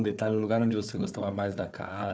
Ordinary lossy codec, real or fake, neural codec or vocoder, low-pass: none; fake; codec, 16 kHz, 4 kbps, FunCodec, trained on Chinese and English, 50 frames a second; none